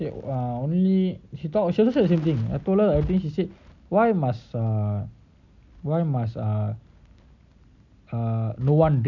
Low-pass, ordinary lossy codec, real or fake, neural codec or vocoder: 7.2 kHz; none; real; none